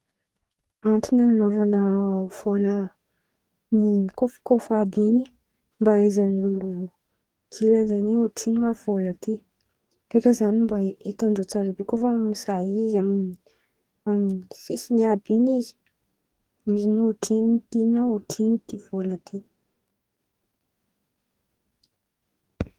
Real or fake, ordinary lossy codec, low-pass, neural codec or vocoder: fake; Opus, 24 kbps; 19.8 kHz; codec, 44.1 kHz, 2.6 kbps, DAC